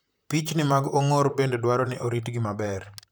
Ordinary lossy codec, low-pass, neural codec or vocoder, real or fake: none; none; vocoder, 44.1 kHz, 128 mel bands, Pupu-Vocoder; fake